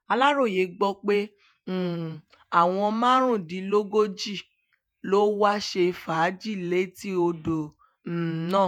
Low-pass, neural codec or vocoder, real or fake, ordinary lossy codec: 19.8 kHz; vocoder, 48 kHz, 128 mel bands, Vocos; fake; none